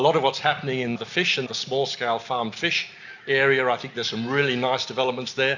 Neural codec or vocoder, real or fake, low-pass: none; real; 7.2 kHz